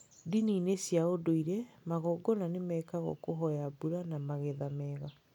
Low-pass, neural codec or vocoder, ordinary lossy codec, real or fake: 19.8 kHz; none; none; real